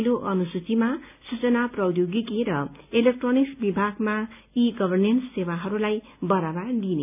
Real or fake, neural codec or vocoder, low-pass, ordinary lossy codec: real; none; 3.6 kHz; none